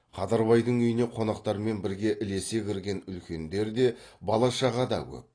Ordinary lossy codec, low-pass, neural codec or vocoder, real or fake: AAC, 32 kbps; 9.9 kHz; none; real